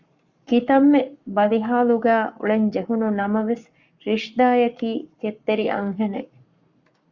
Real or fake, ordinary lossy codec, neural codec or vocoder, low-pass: fake; Opus, 64 kbps; codec, 44.1 kHz, 7.8 kbps, Pupu-Codec; 7.2 kHz